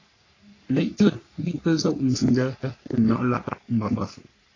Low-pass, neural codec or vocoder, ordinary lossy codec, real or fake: 7.2 kHz; codec, 44.1 kHz, 3.4 kbps, Pupu-Codec; AAC, 32 kbps; fake